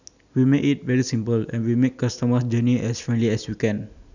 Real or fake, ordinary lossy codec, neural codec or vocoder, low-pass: real; none; none; 7.2 kHz